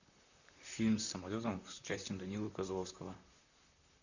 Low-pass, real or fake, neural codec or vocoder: 7.2 kHz; fake; vocoder, 44.1 kHz, 128 mel bands, Pupu-Vocoder